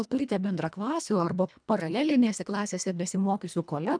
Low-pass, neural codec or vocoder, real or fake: 9.9 kHz; codec, 24 kHz, 1.5 kbps, HILCodec; fake